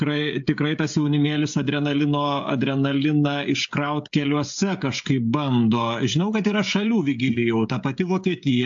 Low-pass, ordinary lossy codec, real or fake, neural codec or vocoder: 7.2 kHz; AAC, 64 kbps; fake; codec, 16 kHz, 16 kbps, FreqCodec, smaller model